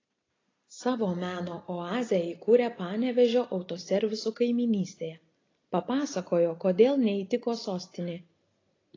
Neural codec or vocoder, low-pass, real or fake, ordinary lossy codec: none; 7.2 kHz; real; AAC, 32 kbps